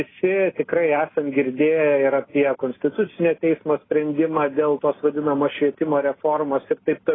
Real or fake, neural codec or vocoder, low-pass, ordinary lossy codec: real; none; 7.2 kHz; AAC, 16 kbps